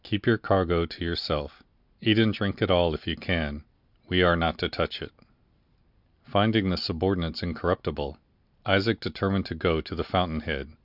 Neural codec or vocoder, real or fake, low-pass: none; real; 5.4 kHz